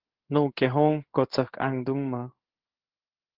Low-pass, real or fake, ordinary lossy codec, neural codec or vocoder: 5.4 kHz; fake; Opus, 16 kbps; codec, 16 kHz in and 24 kHz out, 1 kbps, XY-Tokenizer